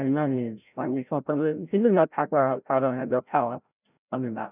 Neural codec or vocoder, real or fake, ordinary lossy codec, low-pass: codec, 16 kHz, 0.5 kbps, FreqCodec, larger model; fake; none; 3.6 kHz